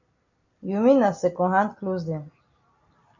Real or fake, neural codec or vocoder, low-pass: real; none; 7.2 kHz